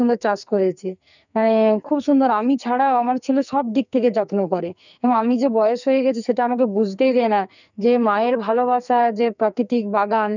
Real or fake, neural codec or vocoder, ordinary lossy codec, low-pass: fake; codec, 44.1 kHz, 2.6 kbps, SNAC; none; 7.2 kHz